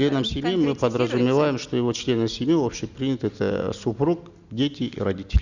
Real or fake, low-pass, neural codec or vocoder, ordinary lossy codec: real; 7.2 kHz; none; Opus, 64 kbps